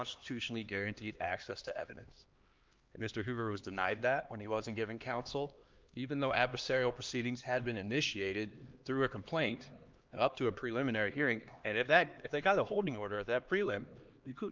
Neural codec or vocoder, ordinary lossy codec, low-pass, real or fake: codec, 16 kHz, 2 kbps, X-Codec, HuBERT features, trained on LibriSpeech; Opus, 24 kbps; 7.2 kHz; fake